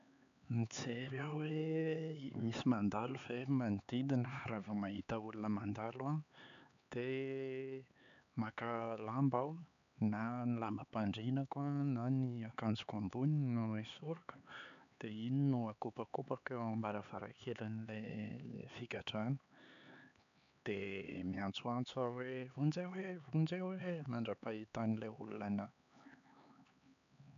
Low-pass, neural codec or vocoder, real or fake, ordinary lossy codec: 7.2 kHz; codec, 16 kHz, 4 kbps, X-Codec, HuBERT features, trained on LibriSpeech; fake; MP3, 96 kbps